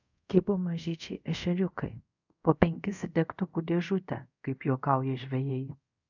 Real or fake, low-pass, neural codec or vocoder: fake; 7.2 kHz; codec, 24 kHz, 0.5 kbps, DualCodec